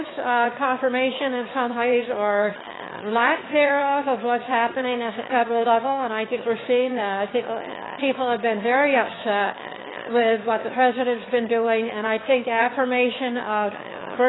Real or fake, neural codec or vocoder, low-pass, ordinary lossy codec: fake; autoencoder, 22.05 kHz, a latent of 192 numbers a frame, VITS, trained on one speaker; 7.2 kHz; AAC, 16 kbps